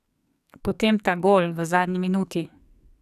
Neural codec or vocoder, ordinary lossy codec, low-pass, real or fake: codec, 44.1 kHz, 2.6 kbps, SNAC; none; 14.4 kHz; fake